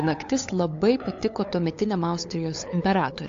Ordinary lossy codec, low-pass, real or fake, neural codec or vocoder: AAC, 48 kbps; 7.2 kHz; fake; codec, 16 kHz, 8 kbps, FunCodec, trained on LibriTTS, 25 frames a second